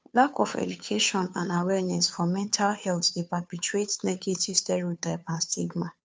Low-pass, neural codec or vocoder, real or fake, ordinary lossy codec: none; codec, 16 kHz, 2 kbps, FunCodec, trained on Chinese and English, 25 frames a second; fake; none